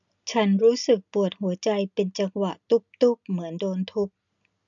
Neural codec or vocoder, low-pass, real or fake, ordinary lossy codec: none; 7.2 kHz; real; none